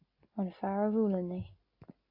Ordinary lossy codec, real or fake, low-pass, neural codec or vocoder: AAC, 32 kbps; real; 5.4 kHz; none